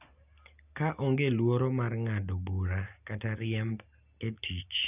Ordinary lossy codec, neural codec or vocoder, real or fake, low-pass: none; none; real; 3.6 kHz